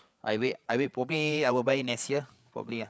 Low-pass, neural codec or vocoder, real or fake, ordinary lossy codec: none; codec, 16 kHz, 8 kbps, FreqCodec, larger model; fake; none